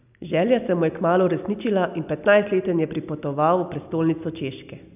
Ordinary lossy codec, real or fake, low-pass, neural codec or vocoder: none; real; 3.6 kHz; none